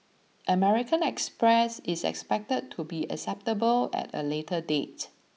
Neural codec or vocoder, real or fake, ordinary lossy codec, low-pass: none; real; none; none